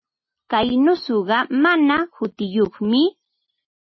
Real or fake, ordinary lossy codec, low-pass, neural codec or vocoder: real; MP3, 24 kbps; 7.2 kHz; none